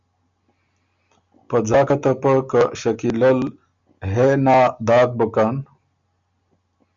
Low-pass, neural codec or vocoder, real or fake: 7.2 kHz; none; real